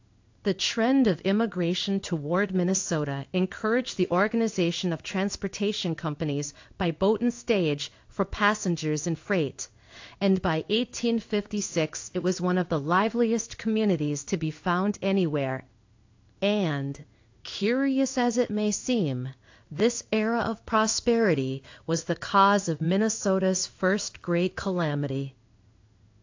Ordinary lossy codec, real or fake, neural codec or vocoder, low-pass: AAC, 48 kbps; fake; codec, 16 kHz in and 24 kHz out, 1 kbps, XY-Tokenizer; 7.2 kHz